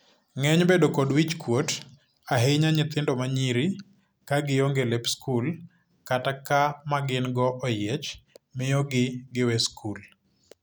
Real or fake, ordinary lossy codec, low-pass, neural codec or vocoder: real; none; none; none